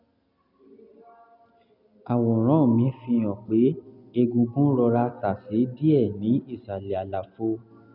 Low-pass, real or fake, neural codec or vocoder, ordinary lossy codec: 5.4 kHz; real; none; none